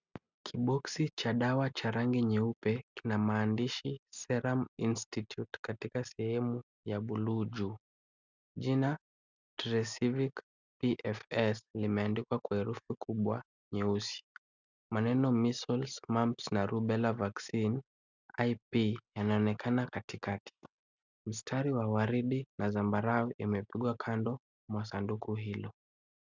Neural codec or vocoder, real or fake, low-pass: none; real; 7.2 kHz